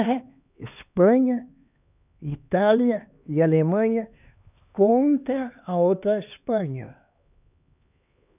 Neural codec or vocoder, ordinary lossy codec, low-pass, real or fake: codec, 16 kHz, 2 kbps, X-Codec, HuBERT features, trained on LibriSpeech; none; 3.6 kHz; fake